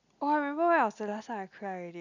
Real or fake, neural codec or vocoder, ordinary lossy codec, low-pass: real; none; none; 7.2 kHz